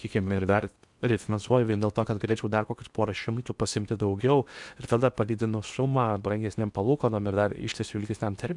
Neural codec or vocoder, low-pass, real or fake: codec, 16 kHz in and 24 kHz out, 0.8 kbps, FocalCodec, streaming, 65536 codes; 10.8 kHz; fake